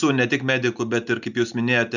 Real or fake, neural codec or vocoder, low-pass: real; none; 7.2 kHz